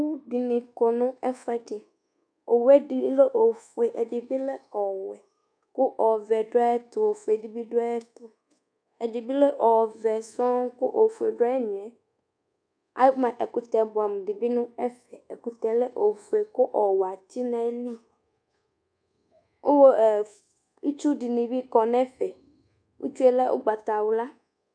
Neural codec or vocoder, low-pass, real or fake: codec, 24 kHz, 1.2 kbps, DualCodec; 9.9 kHz; fake